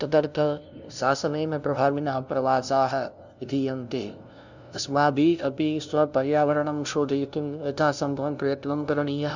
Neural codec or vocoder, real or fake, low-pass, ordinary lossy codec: codec, 16 kHz, 0.5 kbps, FunCodec, trained on LibriTTS, 25 frames a second; fake; 7.2 kHz; none